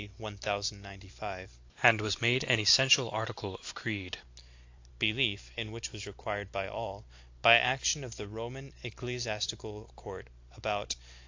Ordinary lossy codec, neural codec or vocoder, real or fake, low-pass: AAC, 48 kbps; none; real; 7.2 kHz